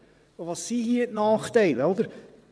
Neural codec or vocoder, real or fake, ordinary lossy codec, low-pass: vocoder, 22.05 kHz, 80 mel bands, WaveNeXt; fake; none; none